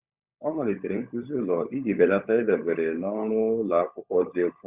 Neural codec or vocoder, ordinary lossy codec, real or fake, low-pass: codec, 16 kHz, 16 kbps, FunCodec, trained on LibriTTS, 50 frames a second; Opus, 64 kbps; fake; 3.6 kHz